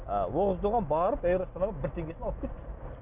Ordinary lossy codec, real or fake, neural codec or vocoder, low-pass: none; real; none; 3.6 kHz